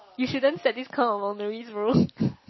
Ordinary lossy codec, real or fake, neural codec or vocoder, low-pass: MP3, 24 kbps; real; none; 7.2 kHz